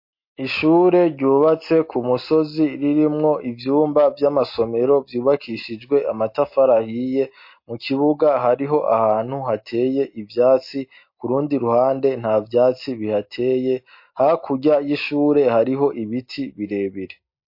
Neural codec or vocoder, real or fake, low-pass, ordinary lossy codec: none; real; 5.4 kHz; MP3, 32 kbps